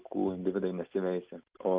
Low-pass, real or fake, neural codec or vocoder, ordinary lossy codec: 3.6 kHz; real; none; Opus, 32 kbps